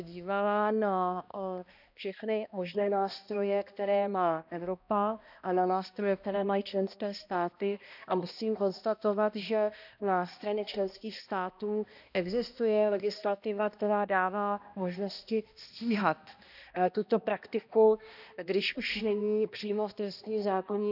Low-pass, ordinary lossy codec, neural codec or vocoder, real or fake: 5.4 kHz; none; codec, 16 kHz, 1 kbps, X-Codec, HuBERT features, trained on balanced general audio; fake